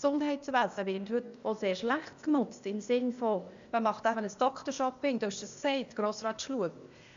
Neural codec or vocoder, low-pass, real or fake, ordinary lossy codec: codec, 16 kHz, 0.8 kbps, ZipCodec; 7.2 kHz; fake; MP3, 64 kbps